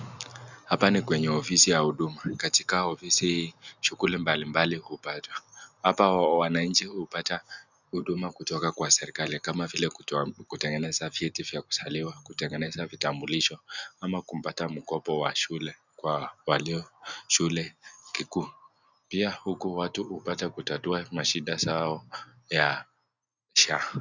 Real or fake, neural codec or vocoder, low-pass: real; none; 7.2 kHz